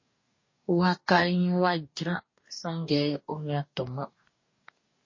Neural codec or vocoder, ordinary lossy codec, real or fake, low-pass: codec, 44.1 kHz, 2.6 kbps, DAC; MP3, 32 kbps; fake; 7.2 kHz